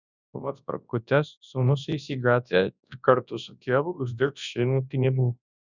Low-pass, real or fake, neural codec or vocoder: 7.2 kHz; fake; codec, 24 kHz, 0.9 kbps, WavTokenizer, large speech release